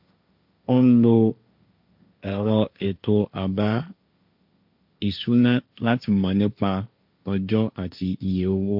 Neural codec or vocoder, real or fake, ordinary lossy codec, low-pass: codec, 16 kHz, 1.1 kbps, Voila-Tokenizer; fake; MP3, 48 kbps; 5.4 kHz